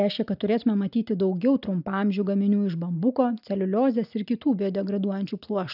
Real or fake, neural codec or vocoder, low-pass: real; none; 5.4 kHz